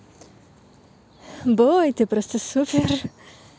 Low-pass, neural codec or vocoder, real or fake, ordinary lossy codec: none; none; real; none